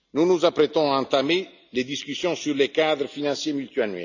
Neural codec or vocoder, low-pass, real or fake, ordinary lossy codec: none; 7.2 kHz; real; none